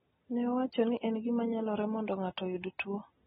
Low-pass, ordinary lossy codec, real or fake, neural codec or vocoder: 7.2 kHz; AAC, 16 kbps; real; none